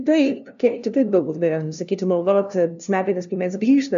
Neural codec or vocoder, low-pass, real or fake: codec, 16 kHz, 0.5 kbps, FunCodec, trained on LibriTTS, 25 frames a second; 7.2 kHz; fake